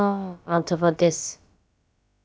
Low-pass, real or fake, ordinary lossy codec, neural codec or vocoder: none; fake; none; codec, 16 kHz, about 1 kbps, DyCAST, with the encoder's durations